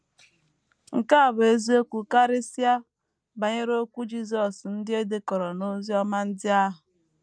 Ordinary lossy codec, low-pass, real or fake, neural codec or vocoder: none; none; real; none